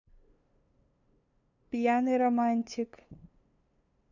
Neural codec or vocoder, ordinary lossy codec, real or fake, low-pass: codec, 16 kHz, 2 kbps, FunCodec, trained on LibriTTS, 25 frames a second; none; fake; 7.2 kHz